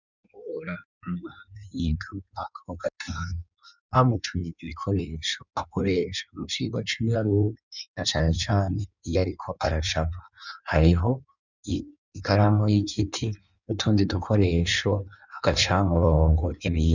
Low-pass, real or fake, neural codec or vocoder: 7.2 kHz; fake; codec, 16 kHz in and 24 kHz out, 1.1 kbps, FireRedTTS-2 codec